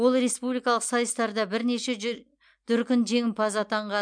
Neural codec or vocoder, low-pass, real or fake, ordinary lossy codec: none; 9.9 kHz; real; MP3, 64 kbps